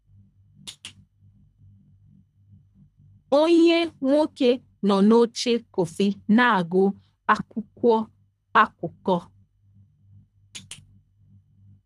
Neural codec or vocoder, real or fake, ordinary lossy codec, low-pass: codec, 24 kHz, 3 kbps, HILCodec; fake; none; none